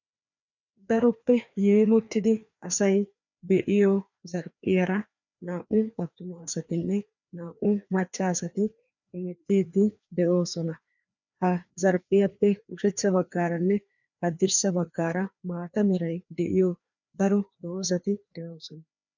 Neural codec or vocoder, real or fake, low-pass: codec, 16 kHz, 2 kbps, FreqCodec, larger model; fake; 7.2 kHz